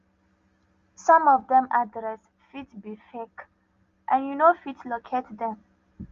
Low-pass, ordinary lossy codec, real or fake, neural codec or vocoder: 7.2 kHz; Opus, 32 kbps; real; none